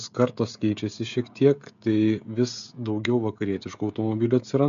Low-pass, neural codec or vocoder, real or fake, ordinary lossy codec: 7.2 kHz; codec, 16 kHz, 16 kbps, FreqCodec, smaller model; fake; MP3, 64 kbps